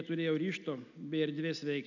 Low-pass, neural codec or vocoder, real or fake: 7.2 kHz; none; real